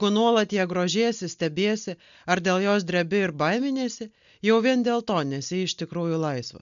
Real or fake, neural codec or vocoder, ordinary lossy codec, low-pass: real; none; MP3, 96 kbps; 7.2 kHz